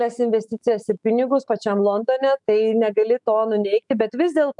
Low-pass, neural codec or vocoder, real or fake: 10.8 kHz; autoencoder, 48 kHz, 128 numbers a frame, DAC-VAE, trained on Japanese speech; fake